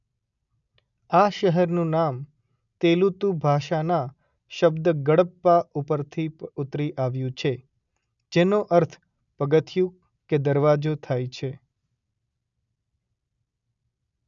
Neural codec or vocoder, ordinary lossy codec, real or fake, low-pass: none; none; real; 7.2 kHz